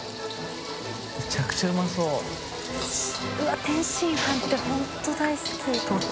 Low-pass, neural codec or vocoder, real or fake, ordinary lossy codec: none; none; real; none